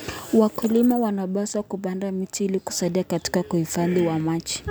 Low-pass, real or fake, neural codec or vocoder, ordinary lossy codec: none; real; none; none